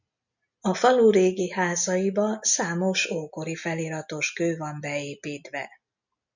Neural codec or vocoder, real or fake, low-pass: none; real; 7.2 kHz